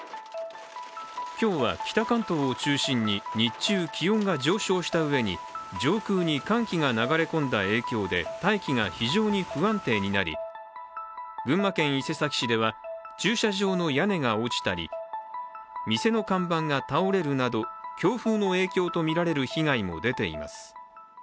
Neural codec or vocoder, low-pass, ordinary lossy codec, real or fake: none; none; none; real